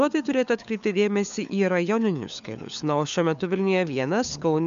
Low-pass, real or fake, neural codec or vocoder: 7.2 kHz; fake; codec, 16 kHz, 4 kbps, FunCodec, trained on LibriTTS, 50 frames a second